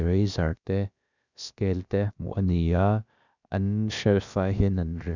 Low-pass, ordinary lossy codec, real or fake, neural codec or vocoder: 7.2 kHz; none; fake; codec, 16 kHz, about 1 kbps, DyCAST, with the encoder's durations